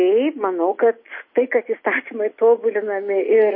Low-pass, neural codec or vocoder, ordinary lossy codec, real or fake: 5.4 kHz; none; MP3, 24 kbps; real